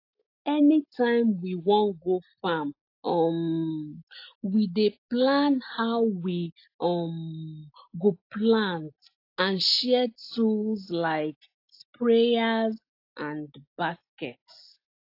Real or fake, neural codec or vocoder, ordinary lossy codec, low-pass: real; none; AAC, 32 kbps; 5.4 kHz